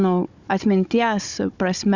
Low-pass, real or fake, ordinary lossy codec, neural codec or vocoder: 7.2 kHz; fake; Opus, 64 kbps; codec, 16 kHz, 16 kbps, FunCodec, trained on Chinese and English, 50 frames a second